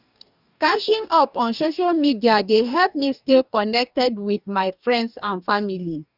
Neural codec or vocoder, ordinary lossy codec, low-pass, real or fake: codec, 44.1 kHz, 2.6 kbps, DAC; none; 5.4 kHz; fake